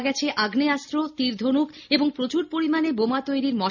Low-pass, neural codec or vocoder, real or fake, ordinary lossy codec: 7.2 kHz; none; real; none